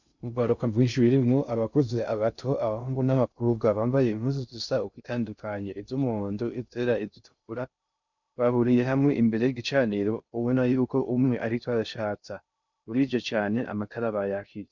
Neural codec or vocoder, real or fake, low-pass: codec, 16 kHz in and 24 kHz out, 0.6 kbps, FocalCodec, streaming, 2048 codes; fake; 7.2 kHz